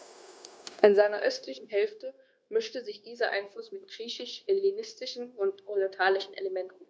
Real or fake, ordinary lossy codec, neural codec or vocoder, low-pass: fake; none; codec, 16 kHz, 0.9 kbps, LongCat-Audio-Codec; none